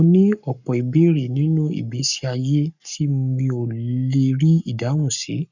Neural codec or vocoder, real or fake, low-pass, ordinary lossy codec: codec, 44.1 kHz, 7.8 kbps, Pupu-Codec; fake; 7.2 kHz; none